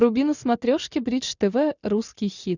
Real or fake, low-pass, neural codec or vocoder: real; 7.2 kHz; none